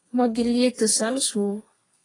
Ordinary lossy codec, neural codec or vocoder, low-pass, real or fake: AAC, 32 kbps; codec, 32 kHz, 1.9 kbps, SNAC; 10.8 kHz; fake